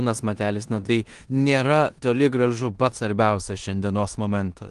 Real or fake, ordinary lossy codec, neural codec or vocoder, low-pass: fake; Opus, 24 kbps; codec, 16 kHz in and 24 kHz out, 0.9 kbps, LongCat-Audio-Codec, four codebook decoder; 10.8 kHz